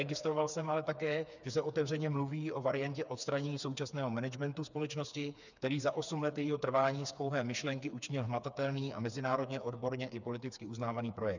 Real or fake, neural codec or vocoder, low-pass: fake; codec, 16 kHz, 4 kbps, FreqCodec, smaller model; 7.2 kHz